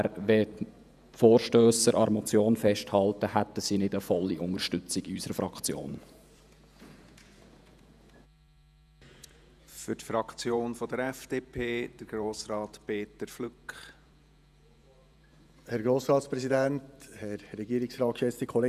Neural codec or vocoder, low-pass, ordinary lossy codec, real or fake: vocoder, 48 kHz, 128 mel bands, Vocos; 14.4 kHz; none; fake